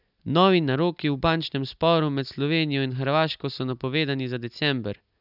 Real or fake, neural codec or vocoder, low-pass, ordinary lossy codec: real; none; 5.4 kHz; none